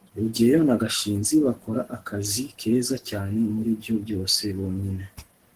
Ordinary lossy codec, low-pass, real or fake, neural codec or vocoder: Opus, 16 kbps; 14.4 kHz; fake; codec, 44.1 kHz, 7.8 kbps, Pupu-Codec